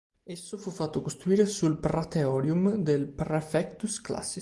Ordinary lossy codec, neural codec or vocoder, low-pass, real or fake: Opus, 24 kbps; none; 10.8 kHz; real